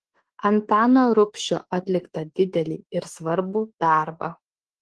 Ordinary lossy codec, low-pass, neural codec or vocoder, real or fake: Opus, 16 kbps; 10.8 kHz; autoencoder, 48 kHz, 32 numbers a frame, DAC-VAE, trained on Japanese speech; fake